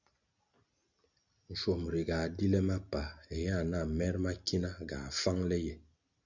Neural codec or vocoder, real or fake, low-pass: none; real; 7.2 kHz